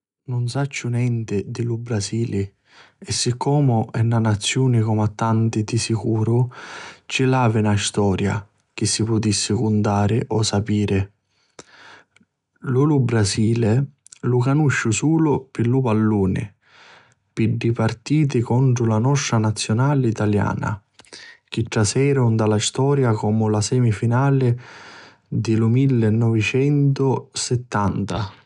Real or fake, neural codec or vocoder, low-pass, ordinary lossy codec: real; none; 10.8 kHz; none